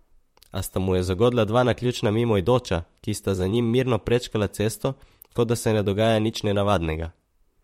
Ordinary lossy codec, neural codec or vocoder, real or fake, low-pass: MP3, 64 kbps; vocoder, 44.1 kHz, 128 mel bands, Pupu-Vocoder; fake; 19.8 kHz